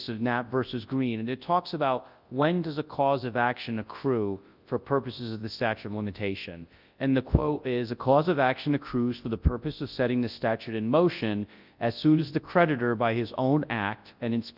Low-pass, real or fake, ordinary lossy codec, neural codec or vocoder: 5.4 kHz; fake; Opus, 24 kbps; codec, 24 kHz, 0.9 kbps, WavTokenizer, large speech release